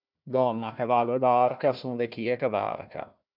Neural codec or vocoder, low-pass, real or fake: codec, 16 kHz, 1 kbps, FunCodec, trained on Chinese and English, 50 frames a second; 5.4 kHz; fake